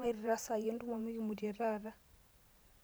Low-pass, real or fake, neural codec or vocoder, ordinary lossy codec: none; fake; vocoder, 44.1 kHz, 128 mel bands every 512 samples, BigVGAN v2; none